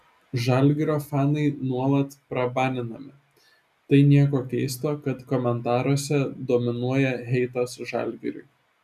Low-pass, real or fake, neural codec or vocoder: 14.4 kHz; real; none